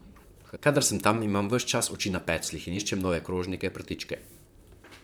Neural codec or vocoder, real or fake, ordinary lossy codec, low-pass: vocoder, 44.1 kHz, 128 mel bands, Pupu-Vocoder; fake; none; none